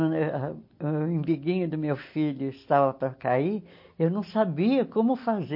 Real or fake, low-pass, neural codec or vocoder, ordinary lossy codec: real; 5.4 kHz; none; MP3, 32 kbps